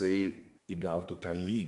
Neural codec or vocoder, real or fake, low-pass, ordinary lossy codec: codec, 24 kHz, 1 kbps, SNAC; fake; 10.8 kHz; MP3, 64 kbps